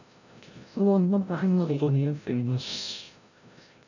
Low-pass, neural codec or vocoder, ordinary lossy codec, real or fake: 7.2 kHz; codec, 16 kHz, 0.5 kbps, FreqCodec, larger model; none; fake